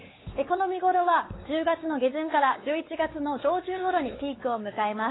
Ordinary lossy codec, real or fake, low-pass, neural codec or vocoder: AAC, 16 kbps; fake; 7.2 kHz; codec, 16 kHz, 4 kbps, X-Codec, WavLM features, trained on Multilingual LibriSpeech